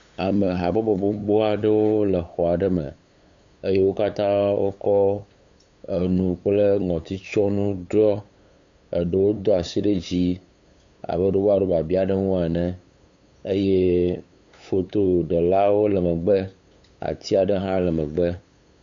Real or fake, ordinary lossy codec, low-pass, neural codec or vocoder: fake; MP3, 64 kbps; 7.2 kHz; codec, 16 kHz, 8 kbps, FunCodec, trained on LibriTTS, 25 frames a second